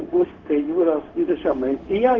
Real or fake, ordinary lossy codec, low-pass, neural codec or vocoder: fake; Opus, 16 kbps; 7.2 kHz; codec, 16 kHz, 0.4 kbps, LongCat-Audio-Codec